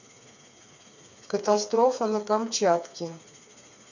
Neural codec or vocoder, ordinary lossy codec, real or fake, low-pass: codec, 16 kHz, 4 kbps, FreqCodec, smaller model; none; fake; 7.2 kHz